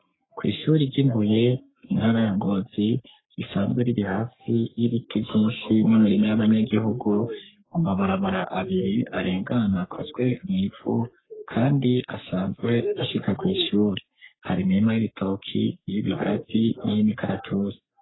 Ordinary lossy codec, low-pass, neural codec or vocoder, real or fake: AAC, 16 kbps; 7.2 kHz; codec, 44.1 kHz, 3.4 kbps, Pupu-Codec; fake